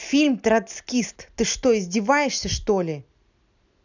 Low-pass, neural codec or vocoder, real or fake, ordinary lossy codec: 7.2 kHz; none; real; none